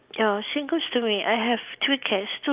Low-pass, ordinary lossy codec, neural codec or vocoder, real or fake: 3.6 kHz; Opus, 24 kbps; none; real